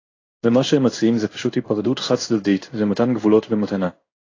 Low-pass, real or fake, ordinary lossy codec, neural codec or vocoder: 7.2 kHz; fake; AAC, 32 kbps; codec, 16 kHz in and 24 kHz out, 1 kbps, XY-Tokenizer